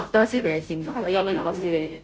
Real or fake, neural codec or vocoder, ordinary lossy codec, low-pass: fake; codec, 16 kHz, 0.5 kbps, FunCodec, trained on Chinese and English, 25 frames a second; none; none